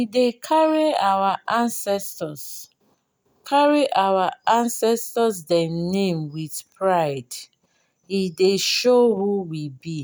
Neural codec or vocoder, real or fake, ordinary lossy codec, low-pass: none; real; none; none